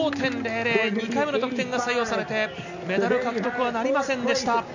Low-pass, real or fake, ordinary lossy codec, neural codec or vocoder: 7.2 kHz; real; none; none